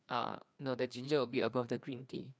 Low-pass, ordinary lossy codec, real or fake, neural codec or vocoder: none; none; fake; codec, 16 kHz, 2 kbps, FreqCodec, larger model